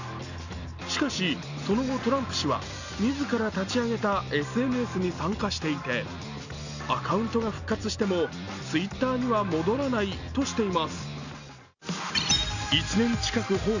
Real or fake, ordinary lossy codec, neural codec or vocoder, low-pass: real; none; none; 7.2 kHz